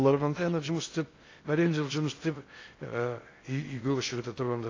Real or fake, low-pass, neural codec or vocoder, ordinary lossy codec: fake; 7.2 kHz; codec, 16 kHz in and 24 kHz out, 0.6 kbps, FocalCodec, streaming, 2048 codes; AAC, 32 kbps